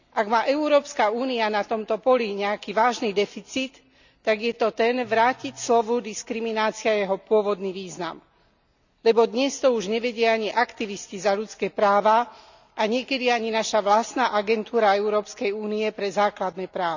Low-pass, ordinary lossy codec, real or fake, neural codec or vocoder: 7.2 kHz; none; real; none